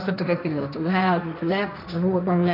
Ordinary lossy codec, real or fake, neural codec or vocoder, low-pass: none; fake; codec, 16 kHz, 1.1 kbps, Voila-Tokenizer; 5.4 kHz